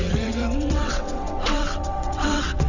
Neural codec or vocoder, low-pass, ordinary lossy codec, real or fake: vocoder, 22.05 kHz, 80 mel bands, WaveNeXt; 7.2 kHz; none; fake